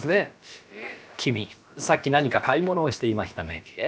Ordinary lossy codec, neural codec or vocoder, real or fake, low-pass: none; codec, 16 kHz, about 1 kbps, DyCAST, with the encoder's durations; fake; none